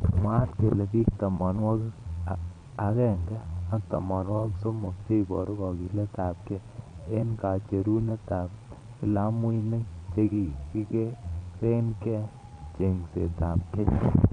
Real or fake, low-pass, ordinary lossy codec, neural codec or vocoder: fake; 9.9 kHz; none; vocoder, 22.05 kHz, 80 mel bands, Vocos